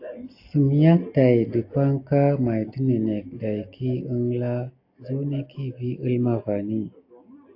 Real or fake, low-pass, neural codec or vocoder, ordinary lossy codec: real; 5.4 kHz; none; AAC, 32 kbps